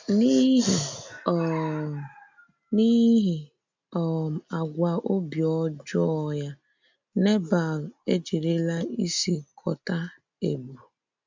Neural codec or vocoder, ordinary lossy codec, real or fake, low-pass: none; MP3, 64 kbps; real; 7.2 kHz